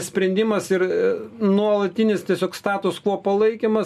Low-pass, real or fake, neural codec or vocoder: 14.4 kHz; real; none